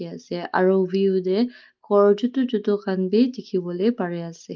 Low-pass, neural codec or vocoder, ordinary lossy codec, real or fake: 7.2 kHz; none; Opus, 32 kbps; real